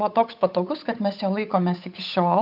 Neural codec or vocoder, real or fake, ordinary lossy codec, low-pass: codec, 16 kHz, 8 kbps, FunCodec, trained on Chinese and English, 25 frames a second; fake; AAC, 48 kbps; 5.4 kHz